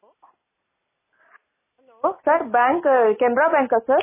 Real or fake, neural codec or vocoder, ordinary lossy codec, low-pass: real; none; MP3, 16 kbps; 3.6 kHz